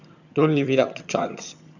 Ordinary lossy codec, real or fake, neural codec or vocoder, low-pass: none; fake; vocoder, 22.05 kHz, 80 mel bands, HiFi-GAN; 7.2 kHz